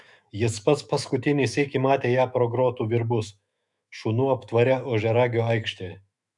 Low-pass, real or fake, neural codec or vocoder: 10.8 kHz; fake; autoencoder, 48 kHz, 128 numbers a frame, DAC-VAE, trained on Japanese speech